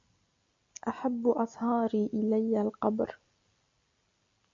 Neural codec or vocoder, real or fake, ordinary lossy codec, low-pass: none; real; MP3, 48 kbps; 7.2 kHz